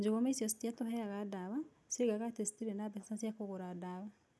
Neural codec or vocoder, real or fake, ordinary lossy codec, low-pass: none; real; none; none